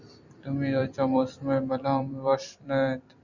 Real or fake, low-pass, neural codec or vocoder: fake; 7.2 kHz; vocoder, 44.1 kHz, 128 mel bands every 256 samples, BigVGAN v2